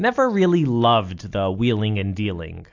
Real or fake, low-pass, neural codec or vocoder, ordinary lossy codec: real; 7.2 kHz; none; AAC, 48 kbps